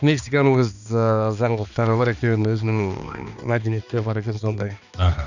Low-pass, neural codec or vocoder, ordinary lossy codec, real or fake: 7.2 kHz; codec, 16 kHz, 2 kbps, X-Codec, HuBERT features, trained on balanced general audio; none; fake